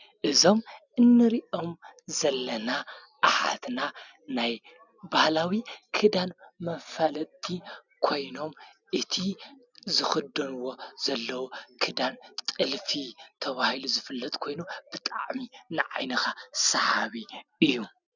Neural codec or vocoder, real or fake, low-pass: none; real; 7.2 kHz